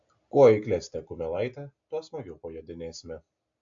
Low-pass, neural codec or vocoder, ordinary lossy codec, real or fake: 7.2 kHz; none; AAC, 64 kbps; real